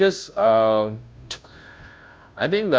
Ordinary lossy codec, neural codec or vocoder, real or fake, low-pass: none; codec, 16 kHz, 0.5 kbps, FunCodec, trained on Chinese and English, 25 frames a second; fake; none